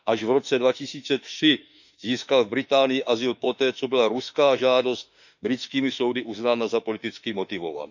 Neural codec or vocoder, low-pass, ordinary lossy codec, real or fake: autoencoder, 48 kHz, 32 numbers a frame, DAC-VAE, trained on Japanese speech; 7.2 kHz; none; fake